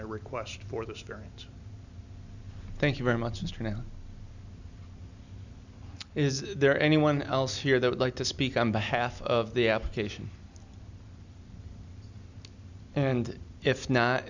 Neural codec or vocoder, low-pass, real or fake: none; 7.2 kHz; real